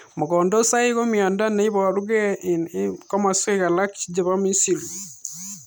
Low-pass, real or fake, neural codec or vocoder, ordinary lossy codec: none; real; none; none